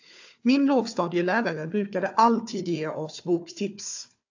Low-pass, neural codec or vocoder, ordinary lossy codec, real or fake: 7.2 kHz; codec, 16 kHz, 4 kbps, FunCodec, trained on Chinese and English, 50 frames a second; AAC, 48 kbps; fake